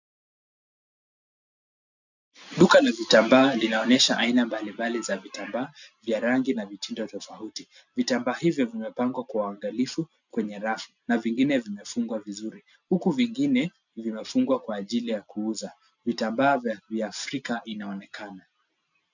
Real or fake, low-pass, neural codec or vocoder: real; 7.2 kHz; none